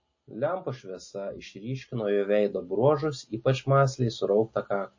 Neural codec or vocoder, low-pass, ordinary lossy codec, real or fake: none; 7.2 kHz; MP3, 32 kbps; real